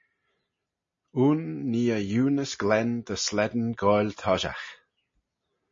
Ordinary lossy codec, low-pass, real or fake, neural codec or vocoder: MP3, 32 kbps; 7.2 kHz; real; none